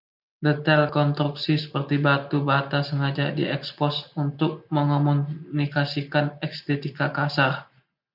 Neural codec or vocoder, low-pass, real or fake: none; 5.4 kHz; real